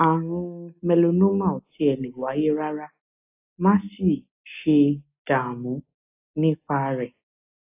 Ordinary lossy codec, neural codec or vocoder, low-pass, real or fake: AAC, 32 kbps; none; 3.6 kHz; real